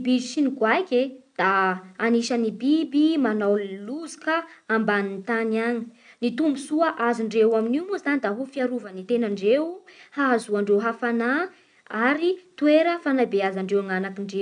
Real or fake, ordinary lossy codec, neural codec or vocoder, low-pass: real; none; none; 9.9 kHz